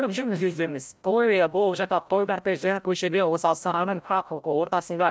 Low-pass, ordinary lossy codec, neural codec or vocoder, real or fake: none; none; codec, 16 kHz, 0.5 kbps, FreqCodec, larger model; fake